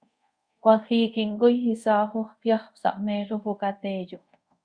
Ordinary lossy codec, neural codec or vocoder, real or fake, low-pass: Opus, 64 kbps; codec, 24 kHz, 0.5 kbps, DualCodec; fake; 9.9 kHz